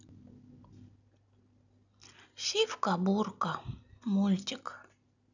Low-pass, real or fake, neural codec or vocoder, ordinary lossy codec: 7.2 kHz; real; none; none